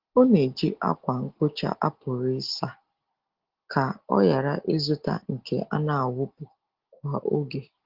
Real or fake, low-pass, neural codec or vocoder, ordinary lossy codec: real; 5.4 kHz; none; Opus, 16 kbps